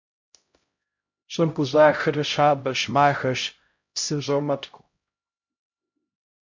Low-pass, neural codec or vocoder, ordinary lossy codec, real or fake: 7.2 kHz; codec, 16 kHz, 0.5 kbps, X-Codec, HuBERT features, trained on LibriSpeech; MP3, 48 kbps; fake